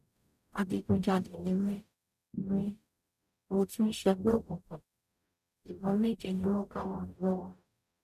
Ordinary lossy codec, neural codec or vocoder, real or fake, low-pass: none; codec, 44.1 kHz, 0.9 kbps, DAC; fake; 14.4 kHz